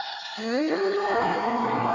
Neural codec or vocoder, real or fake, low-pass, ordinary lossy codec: codec, 24 kHz, 1 kbps, SNAC; fake; 7.2 kHz; none